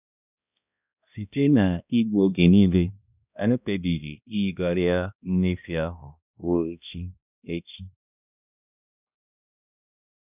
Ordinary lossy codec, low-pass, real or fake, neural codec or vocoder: none; 3.6 kHz; fake; codec, 16 kHz, 1 kbps, X-Codec, HuBERT features, trained on balanced general audio